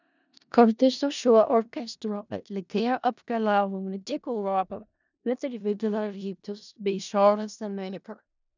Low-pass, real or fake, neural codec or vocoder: 7.2 kHz; fake; codec, 16 kHz in and 24 kHz out, 0.4 kbps, LongCat-Audio-Codec, four codebook decoder